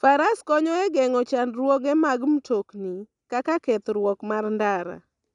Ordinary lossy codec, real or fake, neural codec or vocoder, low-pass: none; real; none; 10.8 kHz